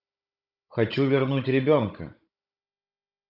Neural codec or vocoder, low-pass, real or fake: codec, 16 kHz, 16 kbps, FunCodec, trained on Chinese and English, 50 frames a second; 5.4 kHz; fake